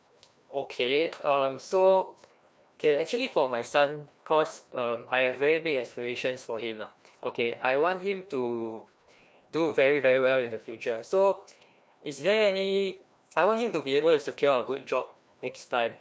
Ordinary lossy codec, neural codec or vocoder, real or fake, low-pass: none; codec, 16 kHz, 1 kbps, FreqCodec, larger model; fake; none